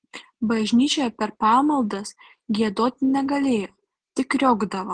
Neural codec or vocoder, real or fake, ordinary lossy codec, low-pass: none; real; Opus, 16 kbps; 9.9 kHz